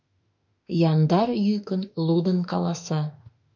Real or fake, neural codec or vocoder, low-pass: fake; autoencoder, 48 kHz, 32 numbers a frame, DAC-VAE, trained on Japanese speech; 7.2 kHz